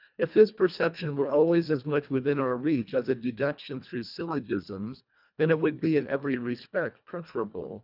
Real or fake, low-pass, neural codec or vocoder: fake; 5.4 kHz; codec, 24 kHz, 1.5 kbps, HILCodec